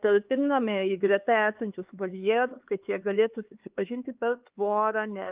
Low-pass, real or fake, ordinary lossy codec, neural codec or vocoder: 3.6 kHz; fake; Opus, 24 kbps; codec, 16 kHz, 4 kbps, X-Codec, HuBERT features, trained on LibriSpeech